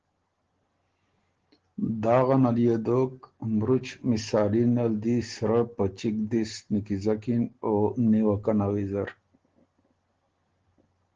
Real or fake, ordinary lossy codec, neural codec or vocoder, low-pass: real; Opus, 16 kbps; none; 7.2 kHz